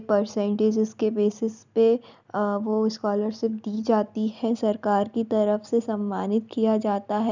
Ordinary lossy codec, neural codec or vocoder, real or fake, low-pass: none; none; real; 7.2 kHz